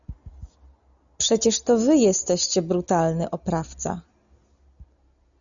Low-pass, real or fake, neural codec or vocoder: 7.2 kHz; real; none